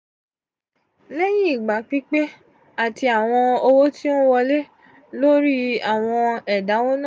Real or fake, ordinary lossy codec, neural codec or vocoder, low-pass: real; none; none; none